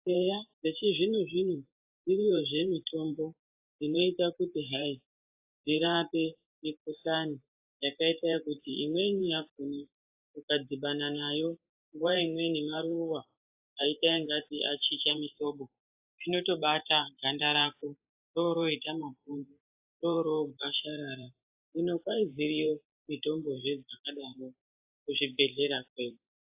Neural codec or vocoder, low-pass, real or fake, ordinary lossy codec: vocoder, 44.1 kHz, 128 mel bands every 512 samples, BigVGAN v2; 3.6 kHz; fake; AAC, 32 kbps